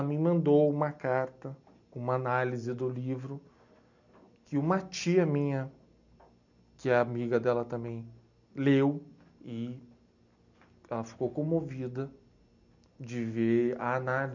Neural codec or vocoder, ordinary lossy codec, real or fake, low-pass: none; none; real; 7.2 kHz